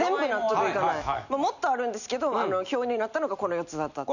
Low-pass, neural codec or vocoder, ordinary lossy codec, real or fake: 7.2 kHz; none; none; real